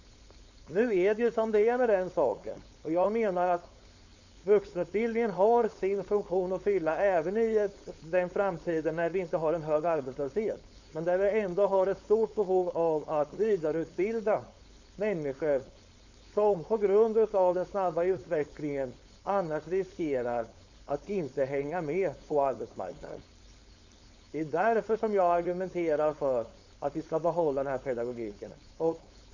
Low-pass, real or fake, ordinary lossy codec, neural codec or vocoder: 7.2 kHz; fake; Opus, 64 kbps; codec, 16 kHz, 4.8 kbps, FACodec